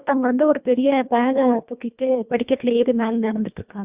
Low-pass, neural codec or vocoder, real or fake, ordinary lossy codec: 3.6 kHz; codec, 24 kHz, 1.5 kbps, HILCodec; fake; Opus, 64 kbps